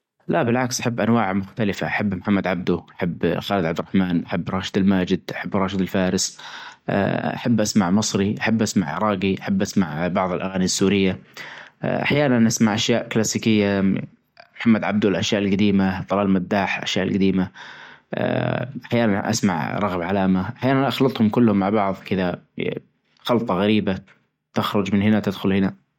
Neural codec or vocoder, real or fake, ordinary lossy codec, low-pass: none; real; MP3, 64 kbps; 19.8 kHz